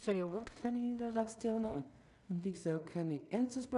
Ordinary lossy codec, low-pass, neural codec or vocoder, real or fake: none; 10.8 kHz; codec, 16 kHz in and 24 kHz out, 0.4 kbps, LongCat-Audio-Codec, two codebook decoder; fake